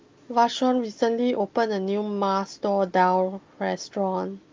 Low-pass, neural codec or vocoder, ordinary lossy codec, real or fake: 7.2 kHz; none; Opus, 32 kbps; real